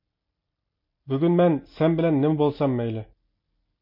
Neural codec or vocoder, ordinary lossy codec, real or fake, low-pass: none; MP3, 32 kbps; real; 5.4 kHz